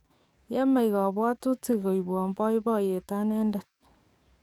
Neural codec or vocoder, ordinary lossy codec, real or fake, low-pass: codec, 44.1 kHz, 7.8 kbps, DAC; none; fake; 19.8 kHz